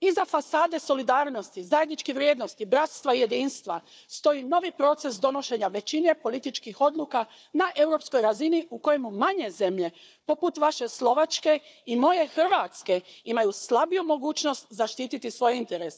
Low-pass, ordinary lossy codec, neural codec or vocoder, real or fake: none; none; codec, 16 kHz, 4 kbps, FunCodec, trained on Chinese and English, 50 frames a second; fake